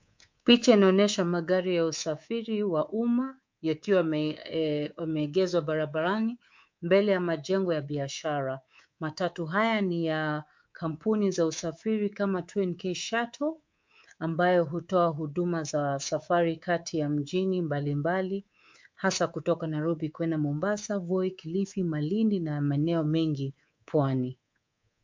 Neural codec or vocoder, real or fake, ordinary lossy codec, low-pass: codec, 24 kHz, 3.1 kbps, DualCodec; fake; MP3, 64 kbps; 7.2 kHz